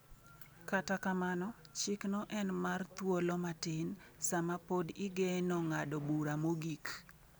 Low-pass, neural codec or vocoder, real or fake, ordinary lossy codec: none; none; real; none